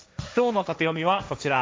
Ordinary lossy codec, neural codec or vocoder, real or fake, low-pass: none; codec, 16 kHz, 1.1 kbps, Voila-Tokenizer; fake; none